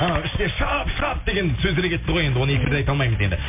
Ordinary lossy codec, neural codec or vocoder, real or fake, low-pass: MP3, 32 kbps; none; real; 3.6 kHz